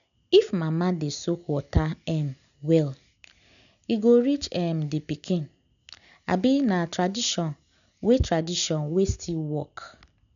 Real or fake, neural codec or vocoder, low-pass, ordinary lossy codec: real; none; 7.2 kHz; none